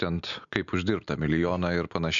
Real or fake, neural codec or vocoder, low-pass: real; none; 7.2 kHz